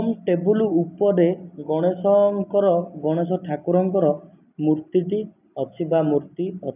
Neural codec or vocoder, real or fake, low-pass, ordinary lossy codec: none; real; 3.6 kHz; none